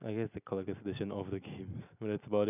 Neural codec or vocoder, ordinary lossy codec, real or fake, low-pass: none; none; real; 3.6 kHz